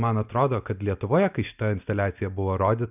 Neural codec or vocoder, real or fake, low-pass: none; real; 3.6 kHz